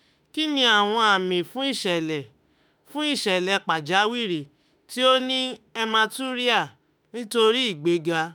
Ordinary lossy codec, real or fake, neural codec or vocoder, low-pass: none; fake; autoencoder, 48 kHz, 32 numbers a frame, DAC-VAE, trained on Japanese speech; none